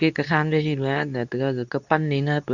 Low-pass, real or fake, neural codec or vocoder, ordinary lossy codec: 7.2 kHz; fake; codec, 24 kHz, 0.9 kbps, WavTokenizer, medium speech release version 2; none